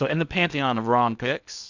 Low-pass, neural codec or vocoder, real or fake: 7.2 kHz; codec, 16 kHz in and 24 kHz out, 0.8 kbps, FocalCodec, streaming, 65536 codes; fake